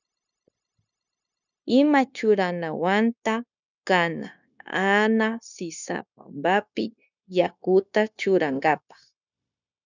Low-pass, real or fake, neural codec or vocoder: 7.2 kHz; fake; codec, 16 kHz, 0.9 kbps, LongCat-Audio-Codec